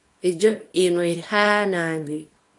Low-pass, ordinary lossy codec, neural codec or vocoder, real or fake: 10.8 kHz; AAC, 48 kbps; codec, 24 kHz, 0.9 kbps, WavTokenizer, small release; fake